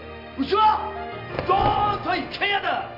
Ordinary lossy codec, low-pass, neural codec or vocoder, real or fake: none; 5.4 kHz; none; real